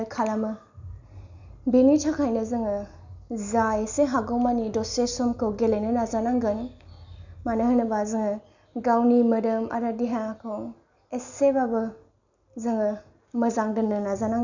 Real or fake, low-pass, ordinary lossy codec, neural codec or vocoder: real; 7.2 kHz; none; none